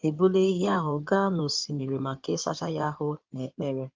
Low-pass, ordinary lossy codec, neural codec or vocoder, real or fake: 7.2 kHz; Opus, 32 kbps; codec, 16 kHz in and 24 kHz out, 2.2 kbps, FireRedTTS-2 codec; fake